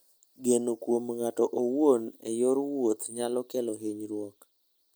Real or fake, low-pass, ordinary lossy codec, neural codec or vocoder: real; none; none; none